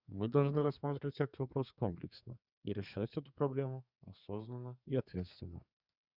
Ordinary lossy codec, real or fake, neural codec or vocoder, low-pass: Opus, 64 kbps; fake; codec, 32 kHz, 1.9 kbps, SNAC; 5.4 kHz